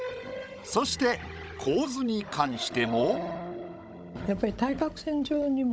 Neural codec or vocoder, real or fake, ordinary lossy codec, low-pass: codec, 16 kHz, 16 kbps, FunCodec, trained on Chinese and English, 50 frames a second; fake; none; none